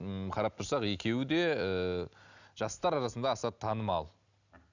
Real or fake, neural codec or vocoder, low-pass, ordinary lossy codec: real; none; 7.2 kHz; none